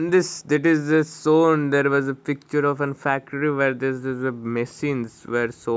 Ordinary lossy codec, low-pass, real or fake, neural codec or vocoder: none; none; real; none